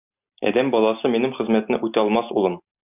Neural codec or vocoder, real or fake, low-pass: none; real; 3.6 kHz